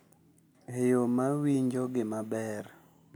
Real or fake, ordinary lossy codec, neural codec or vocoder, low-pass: real; none; none; none